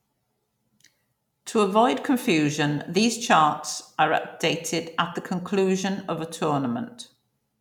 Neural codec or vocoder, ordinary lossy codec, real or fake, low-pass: none; none; real; 19.8 kHz